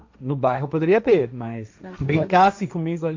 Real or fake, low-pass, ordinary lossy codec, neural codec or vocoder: fake; 7.2 kHz; MP3, 64 kbps; codec, 16 kHz, 1.1 kbps, Voila-Tokenizer